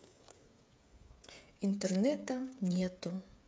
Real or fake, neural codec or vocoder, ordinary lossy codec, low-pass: fake; codec, 16 kHz, 16 kbps, FreqCodec, smaller model; none; none